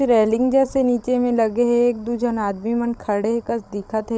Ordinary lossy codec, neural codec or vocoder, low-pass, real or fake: none; codec, 16 kHz, 16 kbps, FreqCodec, larger model; none; fake